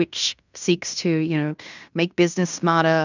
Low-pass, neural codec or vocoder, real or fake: 7.2 kHz; codec, 16 kHz in and 24 kHz out, 0.9 kbps, LongCat-Audio-Codec, fine tuned four codebook decoder; fake